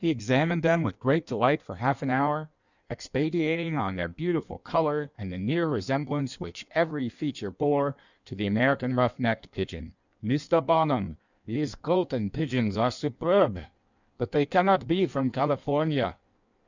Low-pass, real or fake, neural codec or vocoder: 7.2 kHz; fake; codec, 16 kHz in and 24 kHz out, 1.1 kbps, FireRedTTS-2 codec